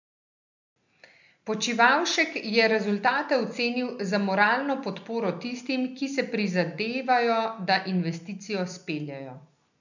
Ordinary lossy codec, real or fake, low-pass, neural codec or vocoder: none; real; 7.2 kHz; none